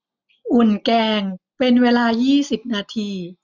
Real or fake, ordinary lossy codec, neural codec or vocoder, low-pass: real; none; none; 7.2 kHz